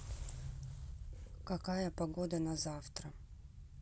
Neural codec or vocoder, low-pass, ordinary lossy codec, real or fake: none; none; none; real